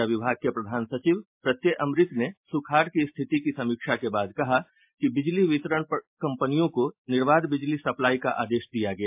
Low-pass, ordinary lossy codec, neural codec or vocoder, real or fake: 3.6 kHz; MP3, 32 kbps; none; real